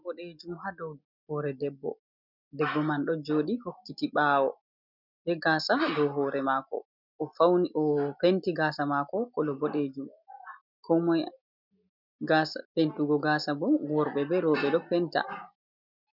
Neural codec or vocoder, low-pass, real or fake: none; 5.4 kHz; real